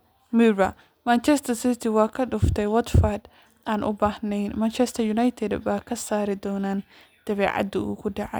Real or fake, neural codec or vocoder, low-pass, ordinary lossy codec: real; none; none; none